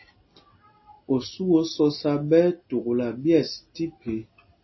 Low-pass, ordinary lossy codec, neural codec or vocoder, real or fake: 7.2 kHz; MP3, 24 kbps; none; real